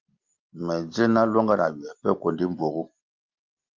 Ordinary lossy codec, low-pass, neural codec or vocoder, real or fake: Opus, 32 kbps; 7.2 kHz; none; real